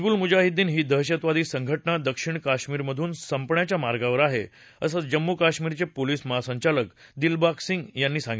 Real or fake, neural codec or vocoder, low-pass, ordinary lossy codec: real; none; none; none